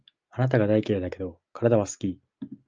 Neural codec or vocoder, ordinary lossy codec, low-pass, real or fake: none; Opus, 24 kbps; 7.2 kHz; real